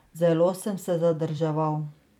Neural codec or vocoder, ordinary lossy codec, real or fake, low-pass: vocoder, 44.1 kHz, 128 mel bands every 256 samples, BigVGAN v2; none; fake; 19.8 kHz